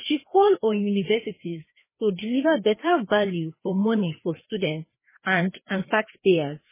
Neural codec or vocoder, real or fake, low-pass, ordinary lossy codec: codec, 16 kHz, 2 kbps, FreqCodec, larger model; fake; 3.6 kHz; MP3, 16 kbps